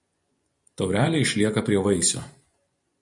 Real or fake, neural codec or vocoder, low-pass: fake; vocoder, 24 kHz, 100 mel bands, Vocos; 10.8 kHz